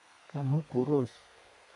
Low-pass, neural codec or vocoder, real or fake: 10.8 kHz; codec, 32 kHz, 1.9 kbps, SNAC; fake